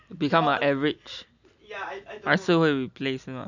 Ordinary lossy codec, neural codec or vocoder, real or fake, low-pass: none; none; real; 7.2 kHz